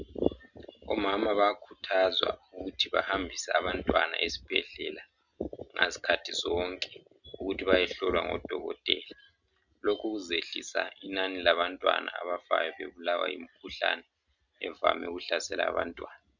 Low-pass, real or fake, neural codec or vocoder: 7.2 kHz; real; none